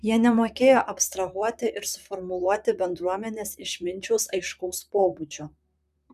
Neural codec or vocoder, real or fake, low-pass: vocoder, 44.1 kHz, 128 mel bands, Pupu-Vocoder; fake; 14.4 kHz